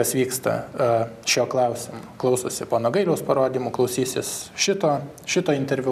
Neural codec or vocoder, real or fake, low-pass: none; real; 14.4 kHz